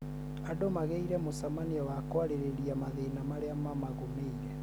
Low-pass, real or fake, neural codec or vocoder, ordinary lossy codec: none; real; none; none